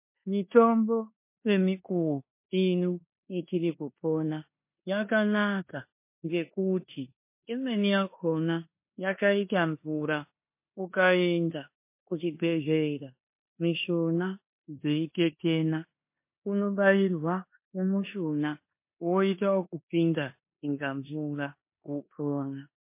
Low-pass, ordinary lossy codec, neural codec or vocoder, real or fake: 3.6 kHz; MP3, 24 kbps; codec, 16 kHz in and 24 kHz out, 0.9 kbps, LongCat-Audio-Codec, four codebook decoder; fake